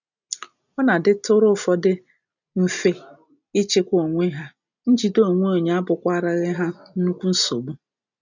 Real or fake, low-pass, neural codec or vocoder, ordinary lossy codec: real; 7.2 kHz; none; none